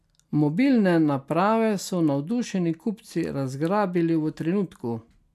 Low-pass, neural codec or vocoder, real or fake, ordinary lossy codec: 14.4 kHz; none; real; none